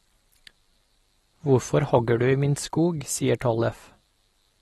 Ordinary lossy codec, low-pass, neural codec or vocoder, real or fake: AAC, 32 kbps; 19.8 kHz; none; real